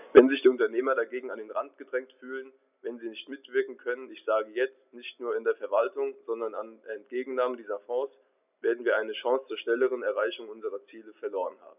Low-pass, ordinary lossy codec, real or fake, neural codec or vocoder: 3.6 kHz; none; real; none